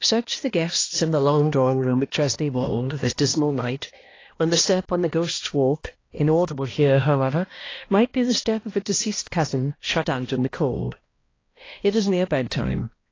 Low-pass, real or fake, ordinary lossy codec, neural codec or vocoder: 7.2 kHz; fake; AAC, 32 kbps; codec, 16 kHz, 1 kbps, X-Codec, HuBERT features, trained on balanced general audio